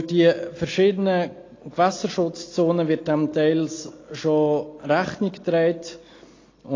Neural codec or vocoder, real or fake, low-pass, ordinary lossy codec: none; real; 7.2 kHz; AAC, 32 kbps